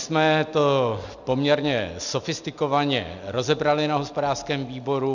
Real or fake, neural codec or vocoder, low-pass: real; none; 7.2 kHz